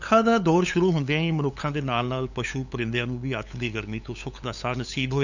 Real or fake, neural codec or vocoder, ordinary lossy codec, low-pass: fake; codec, 16 kHz, 8 kbps, FunCodec, trained on LibriTTS, 25 frames a second; none; 7.2 kHz